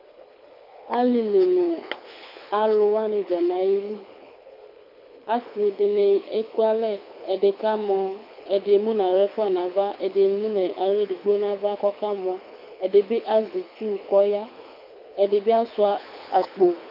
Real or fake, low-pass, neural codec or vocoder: fake; 5.4 kHz; codec, 24 kHz, 6 kbps, HILCodec